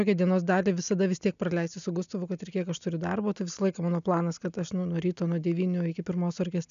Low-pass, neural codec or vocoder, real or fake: 7.2 kHz; none; real